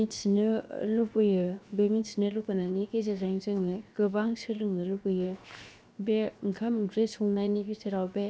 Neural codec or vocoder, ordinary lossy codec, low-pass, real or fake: codec, 16 kHz, 0.7 kbps, FocalCodec; none; none; fake